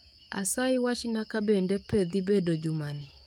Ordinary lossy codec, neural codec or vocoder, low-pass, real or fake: none; codec, 44.1 kHz, 7.8 kbps, DAC; 19.8 kHz; fake